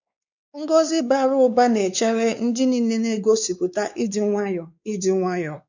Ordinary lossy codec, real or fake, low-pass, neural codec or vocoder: none; fake; 7.2 kHz; codec, 16 kHz, 4 kbps, X-Codec, WavLM features, trained on Multilingual LibriSpeech